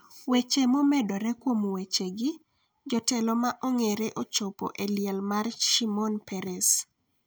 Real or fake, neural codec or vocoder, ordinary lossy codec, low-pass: real; none; none; none